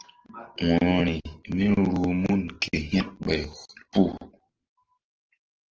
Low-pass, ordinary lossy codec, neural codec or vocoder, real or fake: 7.2 kHz; Opus, 16 kbps; none; real